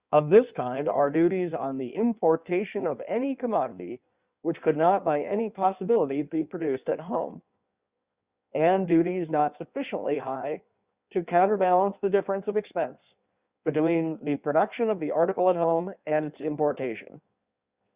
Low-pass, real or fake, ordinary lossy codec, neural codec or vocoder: 3.6 kHz; fake; Opus, 64 kbps; codec, 16 kHz in and 24 kHz out, 1.1 kbps, FireRedTTS-2 codec